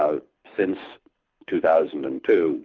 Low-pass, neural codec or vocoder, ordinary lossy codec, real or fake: 7.2 kHz; codec, 24 kHz, 6 kbps, HILCodec; Opus, 24 kbps; fake